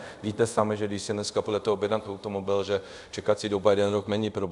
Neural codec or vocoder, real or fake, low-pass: codec, 24 kHz, 0.5 kbps, DualCodec; fake; 10.8 kHz